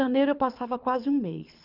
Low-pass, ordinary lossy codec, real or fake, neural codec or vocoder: 5.4 kHz; none; fake; codec, 24 kHz, 6 kbps, HILCodec